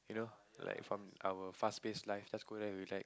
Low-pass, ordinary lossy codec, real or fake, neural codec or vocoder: none; none; real; none